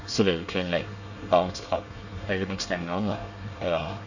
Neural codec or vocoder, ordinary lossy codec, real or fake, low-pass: codec, 24 kHz, 1 kbps, SNAC; none; fake; 7.2 kHz